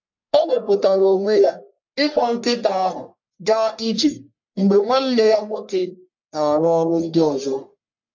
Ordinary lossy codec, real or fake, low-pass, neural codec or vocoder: MP3, 48 kbps; fake; 7.2 kHz; codec, 44.1 kHz, 1.7 kbps, Pupu-Codec